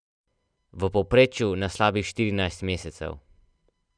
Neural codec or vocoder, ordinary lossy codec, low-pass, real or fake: none; none; 9.9 kHz; real